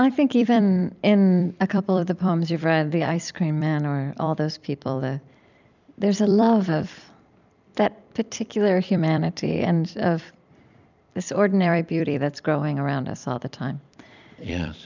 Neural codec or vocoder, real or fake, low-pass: vocoder, 44.1 kHz, 128 mel bands every 256 samples, BigVGAN v2; fake; 7.2 kHz